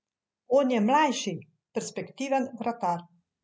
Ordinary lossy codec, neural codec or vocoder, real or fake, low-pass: none; none; real; none